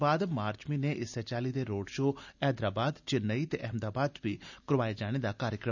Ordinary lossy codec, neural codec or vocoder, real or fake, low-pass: none; none; real; 7.2 kHz